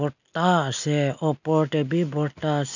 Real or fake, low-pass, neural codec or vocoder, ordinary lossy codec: real; 7.2 kHz; none; none